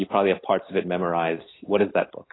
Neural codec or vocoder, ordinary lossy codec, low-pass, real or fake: none; AAC, 16 kbps; 7.2 kHz; real